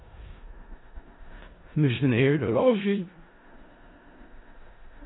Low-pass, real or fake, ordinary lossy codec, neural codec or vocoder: 7.2 kHz; fake; AAC, 16 kbps; codec, 16 kHz in and 24 kHz out, 0.4 kbps, LongCat-Audio-Codec, four codebook decoder